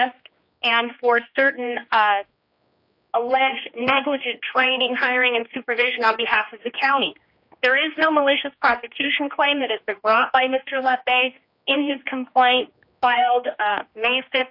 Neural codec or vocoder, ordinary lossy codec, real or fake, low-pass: codec, 16 kHz, 2 kbps, X-Codec, HuBERT features, trained on general audio; AAC, 48 kbps; fake; 5.4 kHz